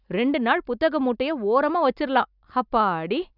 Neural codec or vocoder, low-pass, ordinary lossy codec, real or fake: none; 5.4 kHz; none; real